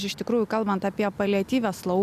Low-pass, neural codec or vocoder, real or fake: 14.4 kHz; none; real